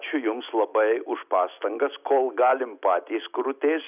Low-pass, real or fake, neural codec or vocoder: 3.6 kHz; real; none